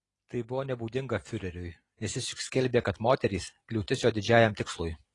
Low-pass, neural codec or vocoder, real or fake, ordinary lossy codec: 10.8 kHz; none; real; AAC, 32 kbps